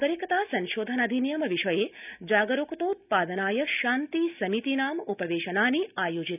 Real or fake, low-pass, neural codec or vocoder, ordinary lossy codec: real; 3.6 kHz; none; none